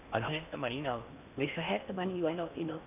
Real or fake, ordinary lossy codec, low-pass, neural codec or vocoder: fake; none; 3.6 kHz; codec, 16 kHz in and 24 kHz out, 0.8 kbps, FocalCodec, streaming, 65536 codes